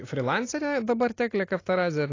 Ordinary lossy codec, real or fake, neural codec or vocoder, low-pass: AAC, 32 kbps; real; none; 7.2 kHz